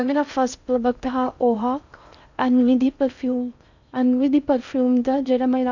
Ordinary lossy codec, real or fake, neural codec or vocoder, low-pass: none; fake; codec, 16 kHz in and 24 kHz out, 0.6 kbps, FocalCodec, streaming, 4096 codes; 7.2 kHz